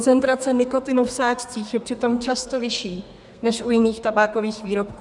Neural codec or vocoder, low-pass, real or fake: codec, 32 kHz, 1.9 kbps, SNAC; 10.8 kHz; fake